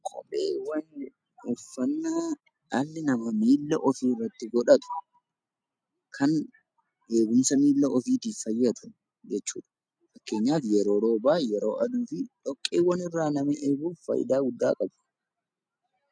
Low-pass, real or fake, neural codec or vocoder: 9.9 kHz; real; none